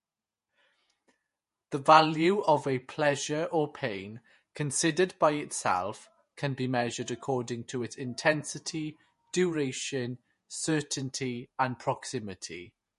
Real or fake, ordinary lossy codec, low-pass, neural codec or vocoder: fake; MP3, 48 kbps; 14.4 kHz; vocoder, 48 kHz, 128 mel bands, Vocos